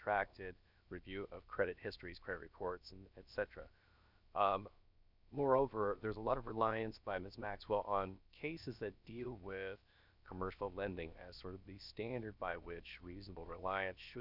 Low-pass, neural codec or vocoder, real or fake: 5.4 kHz; codec, 16 kHz, 0.7 kbps, FocalCodec; fake